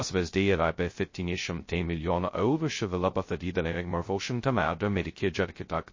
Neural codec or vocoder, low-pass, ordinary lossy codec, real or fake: codec, 16 kHz, 0.2 kbps, FocalCodec; 7.2 kHz; MP3, 32 kbps; fake